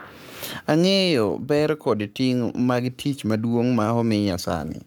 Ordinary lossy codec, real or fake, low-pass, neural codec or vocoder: none; fake; none; codec, 44.1 kHz, 7.8 kbps, Pupu-Codec